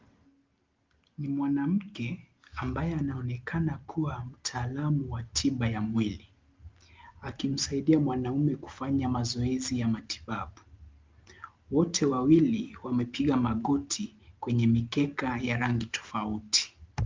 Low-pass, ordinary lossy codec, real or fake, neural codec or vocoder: 7.2 kHz; Opus, 32 kbps; real; none